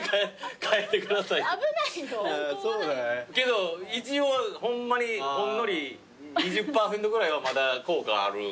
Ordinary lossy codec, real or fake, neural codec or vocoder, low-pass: none; real; none; none